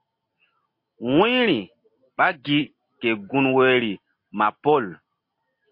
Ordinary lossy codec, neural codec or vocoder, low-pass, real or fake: MP3, 32 kbps; none; 5.4 kHz; real